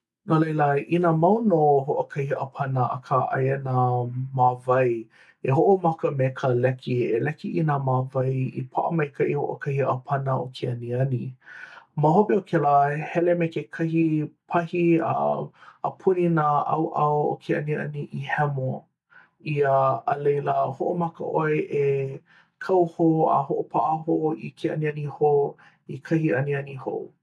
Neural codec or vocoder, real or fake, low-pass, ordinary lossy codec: none; real; none; none